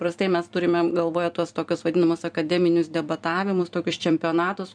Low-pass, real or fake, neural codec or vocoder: 9.9 kHz; fake; vocoder, 44.1 kHz, 128 mel bands every 256 samples, BigVGAN v2